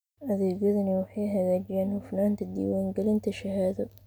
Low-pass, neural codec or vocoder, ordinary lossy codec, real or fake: none; none; none; real